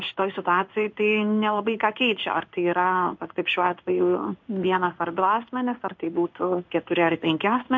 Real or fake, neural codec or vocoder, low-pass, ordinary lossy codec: fake; codec, 16 kHz in and 24 kHz out, 1 kbps, XY-Tokenizer; 7.2 kHz; MP3, 48 kbps